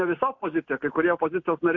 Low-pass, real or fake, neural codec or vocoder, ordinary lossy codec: 7.2 kHz; real; none; MP3, 64 kbps